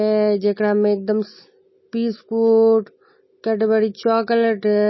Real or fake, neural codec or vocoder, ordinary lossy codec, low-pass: real; none; MP3, 24 kbps; 7.2 kHz